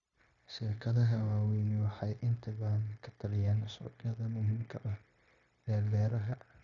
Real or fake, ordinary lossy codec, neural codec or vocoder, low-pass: fake; Opus, 64 kbps; codec, 16 kHz, 0.9 kbps, LongCat-Audio-Codec; 7.2 kHz